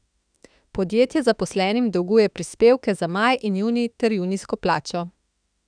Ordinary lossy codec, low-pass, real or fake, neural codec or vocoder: none; 9.9 kHz; fake; autoencoder, 48 kHz, 32 numbers a frame, DAC-VAE, trained on Japanese speech